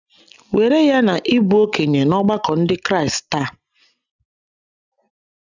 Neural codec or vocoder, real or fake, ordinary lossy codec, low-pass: none; real; none; 7.2 kHz